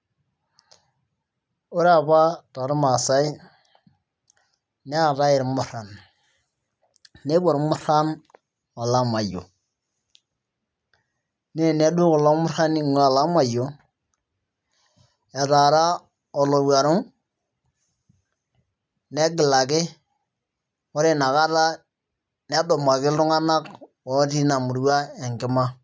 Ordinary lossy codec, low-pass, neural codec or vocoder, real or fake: none; none; none; real